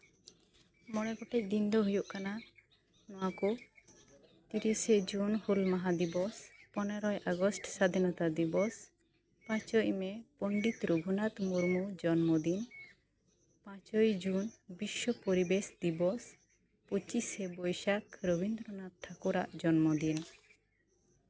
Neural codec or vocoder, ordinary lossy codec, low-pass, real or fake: none; none; none; real